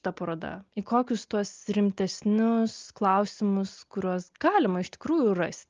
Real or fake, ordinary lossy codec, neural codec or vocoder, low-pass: real; Opus, 32 kbps; none; 7.2 kHz